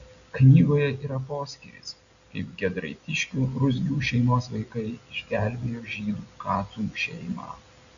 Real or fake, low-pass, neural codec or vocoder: real; 7.2 kHz; none